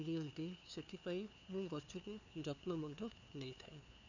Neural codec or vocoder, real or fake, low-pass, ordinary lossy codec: codec, 16 kHz, 2 kbps, FunCodec, trained on LibriTTS, 25 frames a second; fake; 7.2 kHz; none